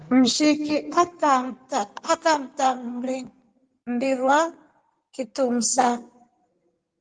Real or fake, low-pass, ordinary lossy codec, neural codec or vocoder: fake; 9.9 kHz; Opus, 24 kbps; codec, 16 kHz in and 24 kHz out, 2.2 kbps, FireRedTTS-2 codec